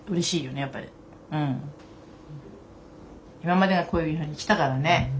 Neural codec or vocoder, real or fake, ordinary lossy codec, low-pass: none; real; none; none